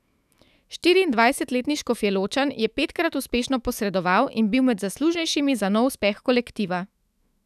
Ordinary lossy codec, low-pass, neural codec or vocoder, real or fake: none; 14.4 kHz; autoencoder, 48 kHz, 128 numbers a frame, DAC-VAE, trained on Japanese speech; fake